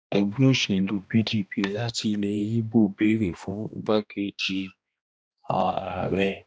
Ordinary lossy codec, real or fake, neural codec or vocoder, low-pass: none; fake; codec, 16 kHz, 1 kbps, X-Codec, HuBERT features, trained on balanced general audio; none